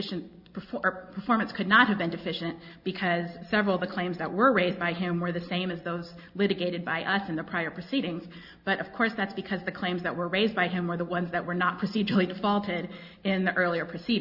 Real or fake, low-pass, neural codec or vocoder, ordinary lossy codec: real; 5.4 kHz; none; Opus, 64 kbps